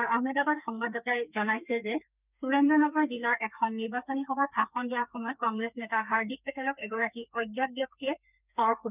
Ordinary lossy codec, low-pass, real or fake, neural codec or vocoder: none; 3.6 kHz; fake; codec, 32 kHz, 1.9 kbps, SNAC